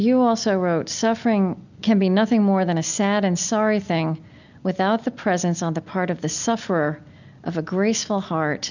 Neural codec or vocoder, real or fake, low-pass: none; real; 7.2 kHz